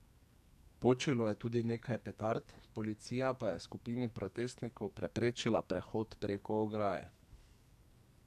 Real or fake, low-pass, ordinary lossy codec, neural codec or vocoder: fake; 14.4 kHz; none; codec, 44.1 kHz, 2.6 kbps, SNAC